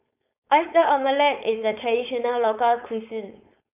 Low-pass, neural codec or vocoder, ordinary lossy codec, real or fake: 3.6 kHz; codec, 16 kHz, 4.8 kbps, FACodec; none; fake